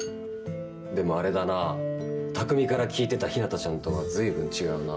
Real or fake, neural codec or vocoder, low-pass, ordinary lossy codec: real; none; none; none